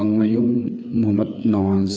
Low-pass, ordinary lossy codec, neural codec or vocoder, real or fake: none; none; codec, 16 kHz, 4 kbps, FreqCodec, larger model; fake